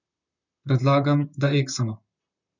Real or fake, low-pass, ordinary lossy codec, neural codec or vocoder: fake; 7.2 kHz; none; vocoder, 44.1 kHz, 128 mel bands, Pupu-Vocoder